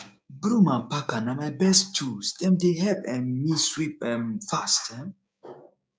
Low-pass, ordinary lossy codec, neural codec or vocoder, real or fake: none; none; codec, 16 kHz, 6 kbps, DAC; fake